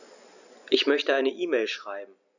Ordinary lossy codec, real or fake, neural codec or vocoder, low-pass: none; real; none; 7.2 kHz